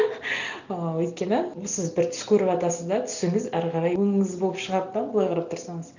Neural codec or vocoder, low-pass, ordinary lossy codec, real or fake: none; 7.2 kHz; none; real